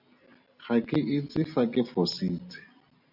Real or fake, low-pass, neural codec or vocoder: real; 5.4 kHz; none